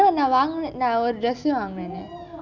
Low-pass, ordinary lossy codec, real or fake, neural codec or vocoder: 7.2 kHz; none; real; none